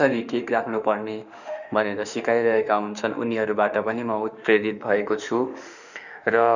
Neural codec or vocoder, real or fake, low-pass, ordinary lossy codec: autoencoder, 48 kHz, 32 numbers a frame, DAC-VAE, trained on Japanese speech; fake; 7.2 kHz; none